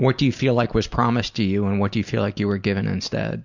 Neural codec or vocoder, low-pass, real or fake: none; 7.2 kHz; real